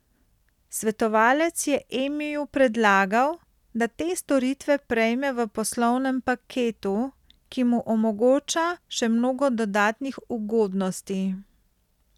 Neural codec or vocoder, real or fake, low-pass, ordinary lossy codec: none; real; 19.8 kHz; none